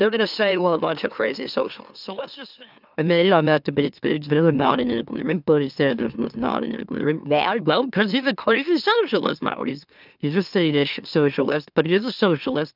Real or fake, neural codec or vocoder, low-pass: fake; autoencoder, 44.1 kHz, a latent of 192 numbers a frame, MeloTTS; 5.4 kHz